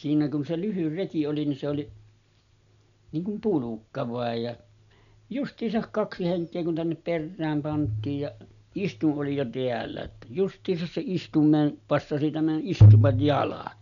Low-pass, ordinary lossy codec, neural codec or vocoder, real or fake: 7.2 kHz; none; none; real